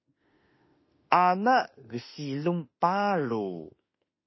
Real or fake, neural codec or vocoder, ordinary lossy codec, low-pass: fake; autoencoder, 48 kHz, 32 numbers a frame, DAC-VAE, trained on Japanese speech; MP3, 24 kbps; 7.2 kHz